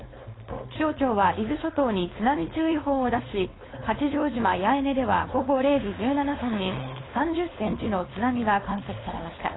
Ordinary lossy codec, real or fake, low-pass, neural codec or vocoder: AAC, 16 kbps; fake; 7.2 kHz; codec, 16 kHz, 4.8 kbps, FACodec